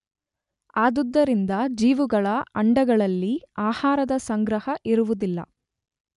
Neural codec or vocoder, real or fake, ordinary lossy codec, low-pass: none; real; none; 10.8 kHz